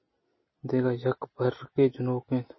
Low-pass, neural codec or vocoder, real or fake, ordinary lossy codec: 7.2 kHz; none; real; MP3, 24 kbps